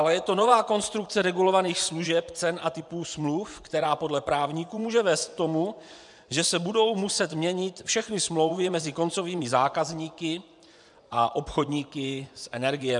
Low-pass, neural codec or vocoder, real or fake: 10.8 kHz; vocoder, 24 kHz, 100 mel bands, Vocos; fake